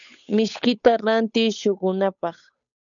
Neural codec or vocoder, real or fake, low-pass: codec, 16 kHz, 8 kbps, FunCodec, trained on Chinese and English, 25 frames a second; fake; 7.2 kHz